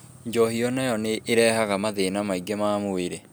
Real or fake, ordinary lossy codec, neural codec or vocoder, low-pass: real; none; none; none